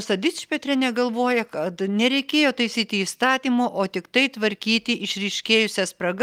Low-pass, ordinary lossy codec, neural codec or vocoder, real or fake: 19.8 kHz; Opus, 32 kbps; none; real